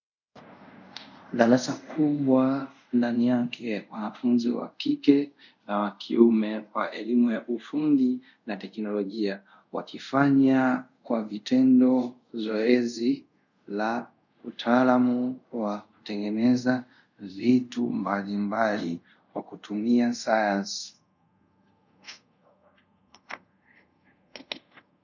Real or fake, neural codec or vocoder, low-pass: fake; codec, 24 kHz, 0.5 kbps, DualCodec; 7.2 kHz